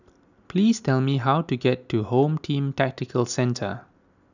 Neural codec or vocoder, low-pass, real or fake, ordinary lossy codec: none; 7.2 kHz; real; none